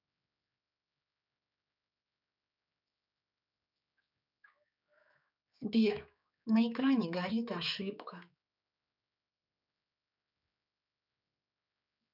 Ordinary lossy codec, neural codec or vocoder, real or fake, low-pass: none; codec, 16 kHz, 4 kbps, X-Codec, HuBERT features, trained on general audio; fake; 5.4 kHz